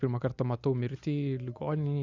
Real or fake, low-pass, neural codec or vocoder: real; 7.2 kHz; none